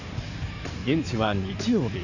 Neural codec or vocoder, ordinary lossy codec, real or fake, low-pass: codec, 16 kHz in and 24 kHz out, 2.2 kbps, FireRedTTS-2 codec; none; fake; 7.2 kHz